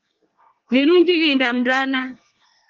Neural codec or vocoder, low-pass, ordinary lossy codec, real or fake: codec, 24 kHz, 1 kbps, SNAC; 7.2 kHz; Opus, 32 kbps; fake